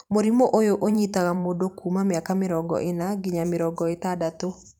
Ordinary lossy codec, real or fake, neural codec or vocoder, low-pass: none; fake; vocoder, 44.1 kHz, 128 mel bands every 512 samples, BigVGAN v2; 19.8 kHz